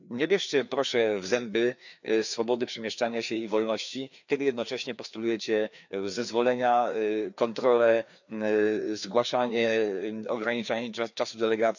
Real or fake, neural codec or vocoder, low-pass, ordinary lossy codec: fake; codec, 16 kHz, 2 kbps, FreqCodec, larger model; 7.2 kHz; none